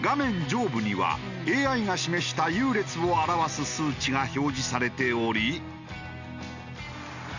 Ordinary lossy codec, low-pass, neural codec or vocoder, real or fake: none; 7.2 kHz; none; real